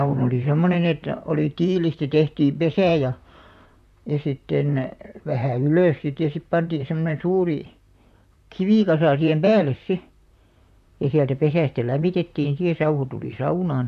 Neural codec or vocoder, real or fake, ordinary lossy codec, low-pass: vocoder, 44.1 kHz, 128 mel bands, Pupu-Vocoder; fake; MP3, 96 kbps; 14.4 kHz